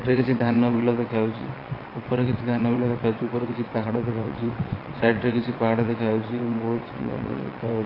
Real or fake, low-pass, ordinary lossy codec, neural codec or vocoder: fake; 5.4 kHz; AAC, 24 kbps; vocoder, 22.05 kHz, 80 mel bands, WaveNeXt